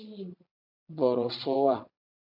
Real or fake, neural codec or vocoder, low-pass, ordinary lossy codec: fake; vocoder, 22.05 kHz, 80 mel bands, Vocos; 5.4 kHz; AAC, 48 kbps